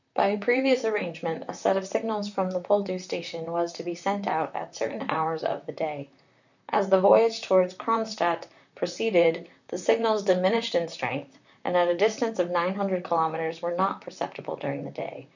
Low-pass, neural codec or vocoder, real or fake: 7.2 kHz; vocoder, 44.1 kHz, 128 mel bands, Pupu-Vocoder; fake